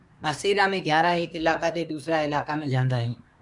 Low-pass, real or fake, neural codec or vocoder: 10.8 kHz; fake; codec, 24 kHz, 1 kbps, SNAC